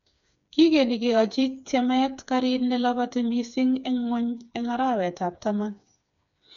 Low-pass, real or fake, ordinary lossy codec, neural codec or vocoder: 7.2 kHz; fake; MP3, 96 kbps; codec, 16 kHz, 4 kbps, FreqCodec, smaller model